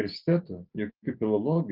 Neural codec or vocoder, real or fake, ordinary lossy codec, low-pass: none; real; Opus, 24 kbps; 5.4 kHz